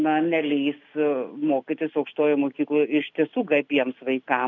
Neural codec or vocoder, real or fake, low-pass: none; real; 7.2 kHz